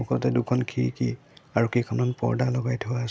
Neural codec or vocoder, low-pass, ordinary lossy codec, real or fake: none; none; none; real